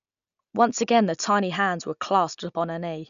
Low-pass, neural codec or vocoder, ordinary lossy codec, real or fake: 7.2 kHz; none; none; real